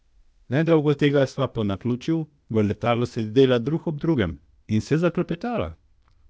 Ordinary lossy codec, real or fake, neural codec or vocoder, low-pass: none; fake; codec, 16 kHz, 0.8 kbps, ZipCodec; none